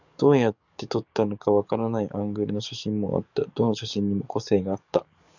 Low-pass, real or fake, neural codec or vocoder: 7.2 kHz; fake; codec, 44.1 kHz, 7.8 kbps, DAC